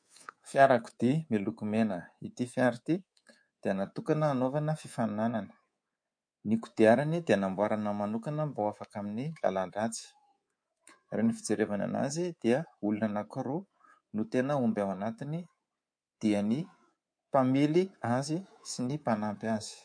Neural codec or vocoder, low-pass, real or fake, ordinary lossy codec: codec, 24 kHz, 3.1 kbps, DualCodec; 9.9 kHz; fake; MP3, 48 kbps